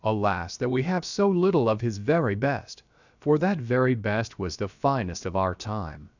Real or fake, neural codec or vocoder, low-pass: fake; codec, 16 kHz, about 1 kbps, DyCAST, with the encoder's durations; 7.2 kHz